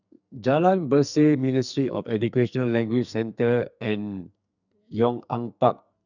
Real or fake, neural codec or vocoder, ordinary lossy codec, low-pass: fake; codec, 44.1 kHz, 2.6 kbps, SNAC; none; 7.2 kHz